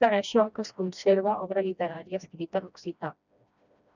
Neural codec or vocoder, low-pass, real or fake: codec, 16 kHz, 1 kbps, FreqCodec, smaller model; 7.2 kHz; fake